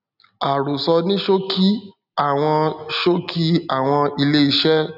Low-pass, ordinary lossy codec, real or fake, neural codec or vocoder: 5.4 kHz; none; real; none